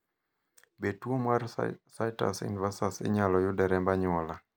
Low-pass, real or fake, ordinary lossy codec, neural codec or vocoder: none; real; none; none